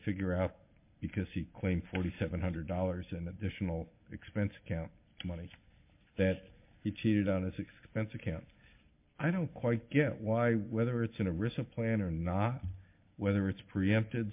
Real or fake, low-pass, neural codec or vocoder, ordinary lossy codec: real; 3.6 kHz; none; MP3, 32 kbps